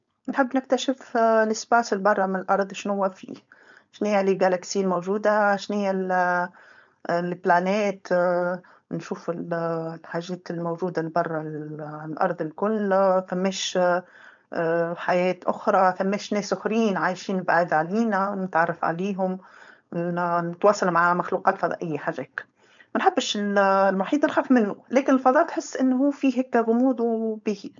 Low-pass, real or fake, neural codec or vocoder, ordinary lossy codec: 7.2 kHz; fake; codec, 16 kHz, 4.8 kbps, FACodec; MP3, 64 kbps